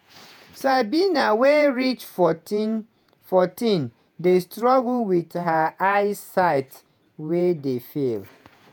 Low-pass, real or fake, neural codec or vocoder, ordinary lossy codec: none; fake; vocoder, 48 kHz, 128 mel bands, Vocos; none